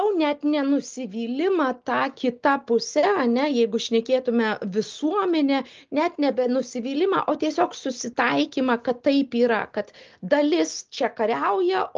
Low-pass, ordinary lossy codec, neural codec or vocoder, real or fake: 7.2 kHz; Opus, 24 kbps; none; real